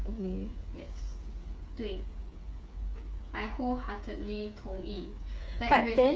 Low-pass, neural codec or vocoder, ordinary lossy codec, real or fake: none; codec, 16 kHz, 8 kbps, FreqCodec, smaller model; none; fake